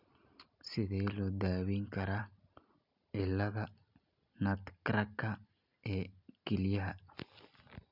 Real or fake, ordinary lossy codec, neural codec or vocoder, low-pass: real; none; none; 5.4 kHz